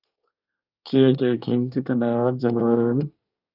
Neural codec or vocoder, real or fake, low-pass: codec, 24 kHz, 1 kbps, SNAC; fake; 5.4 kHz